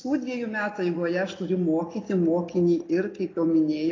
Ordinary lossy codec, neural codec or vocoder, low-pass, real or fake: AAC, 32 kbps; none; 7.2 kHz; real